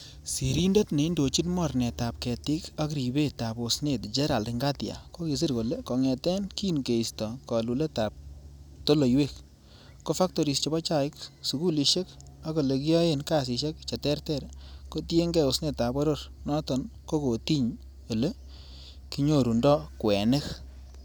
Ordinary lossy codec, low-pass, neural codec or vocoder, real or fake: none; none; none; real